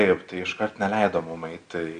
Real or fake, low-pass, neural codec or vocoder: real; 9.9 kHz; none